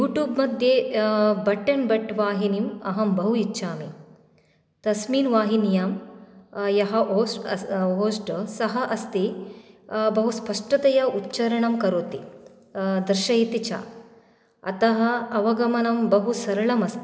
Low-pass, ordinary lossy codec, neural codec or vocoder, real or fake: none; none; none; real